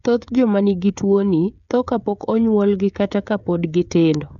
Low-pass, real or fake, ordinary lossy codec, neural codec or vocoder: 7.2 kHz; fake; none; codec, 16 kHz, 16 kbps, FreqCodec, smaller model